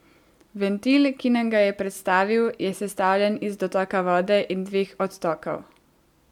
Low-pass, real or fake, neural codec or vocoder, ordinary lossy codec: 19.8 kHz; real; none; MP3, 96 kbps